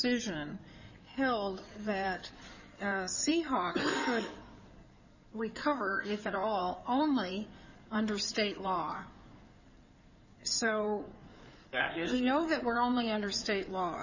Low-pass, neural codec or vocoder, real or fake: 7.2 kHz; codec, 16 kHz in and 24 kHz out, 2.2 kbps, FireRedTTS-2 codec; fake